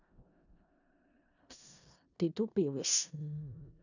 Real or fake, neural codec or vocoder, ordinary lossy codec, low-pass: fake; codec, 16 kHz in and 24 kHz out, 0.4 kbps, LongCat-Audio-Codec, four codebook decoder; none; 7.2 kHz